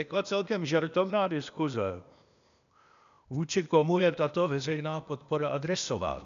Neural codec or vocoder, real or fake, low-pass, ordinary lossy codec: codec, 16 kHz, 0.8 kbps, ZipCodec; fake; 7.2 kHz; MP3, 96 kbps